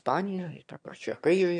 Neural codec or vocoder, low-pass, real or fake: autoencoder, 22.05 kHz, a latent of 192 numbers a frame, VITS, trained on one speaker; 9.9 kHz; fake